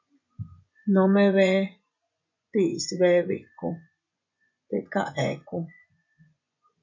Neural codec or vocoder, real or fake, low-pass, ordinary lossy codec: vocoder, 24 kHz, 100 mel bands, Vocos; fake; 7.2 kHz; MP3, 64 kbps